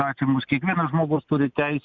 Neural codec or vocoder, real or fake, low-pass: none; real; 7.2 kHz